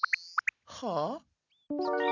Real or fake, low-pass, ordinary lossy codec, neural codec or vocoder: real; 7.2 kHz; none; none